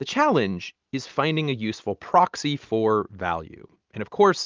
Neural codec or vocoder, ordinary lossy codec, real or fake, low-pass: none; Opus, 24 kbps; real; 7.2 kHz